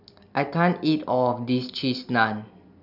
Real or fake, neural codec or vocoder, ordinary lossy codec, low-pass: real; none; none; 5.4 kHz